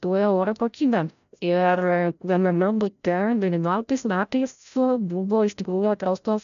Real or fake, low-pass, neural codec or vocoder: fake; 7.2 kHz; codec, 16 kHz, 0.5 kbps, FreqCodec, larger model